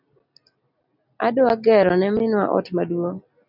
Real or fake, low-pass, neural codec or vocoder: real; 5.4 kHz; none